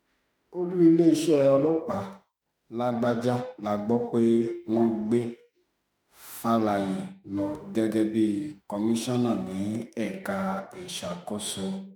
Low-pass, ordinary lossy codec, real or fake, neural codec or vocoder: none; none; fake; autoencoder, 48 kHz, 32 numbers a frame, DAC-VAE, trained on Japanese speech